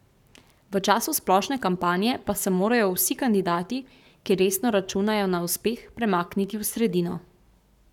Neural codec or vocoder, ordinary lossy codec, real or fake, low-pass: codec, 44.1 kHz, 7.8 kbps, Pupu-Codec; none; fake; 19.8 kHz